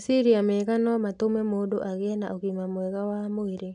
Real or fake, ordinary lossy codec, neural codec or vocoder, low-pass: real; none; none; 9.9 kHz